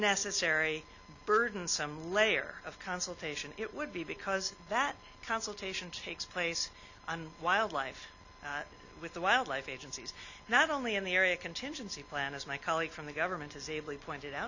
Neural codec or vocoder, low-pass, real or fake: none; 7.2 kHz; real